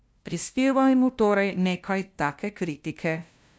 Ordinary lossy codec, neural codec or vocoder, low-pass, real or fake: none; codec, 16 kHz, 0.5 kbps, FunCodec, trained on LibriTTS, 25 frames a second; none; fake